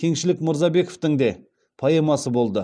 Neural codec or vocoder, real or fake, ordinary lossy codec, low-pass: none; real; none; 9.9 kHz